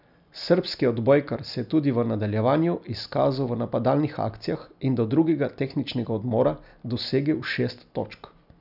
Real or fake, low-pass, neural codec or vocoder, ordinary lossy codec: real; 5.4 kHz; none; none